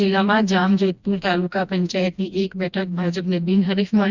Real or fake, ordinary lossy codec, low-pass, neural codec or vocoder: fake; Opus, 64 kbps; 7.2 kHz; codec, 16 kHz, 1 kbps, FreqCodec, smaller model